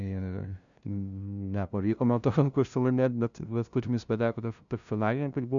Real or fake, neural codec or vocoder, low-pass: fake; codec, 16 kHz, 0.5 kbps, FunCodec, trained on LibriTTS, 25 frames a second; 7.2 kHz